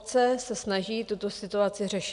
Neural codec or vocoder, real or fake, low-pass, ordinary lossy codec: none; real; 10.8 kHz; MP3, 96 kbps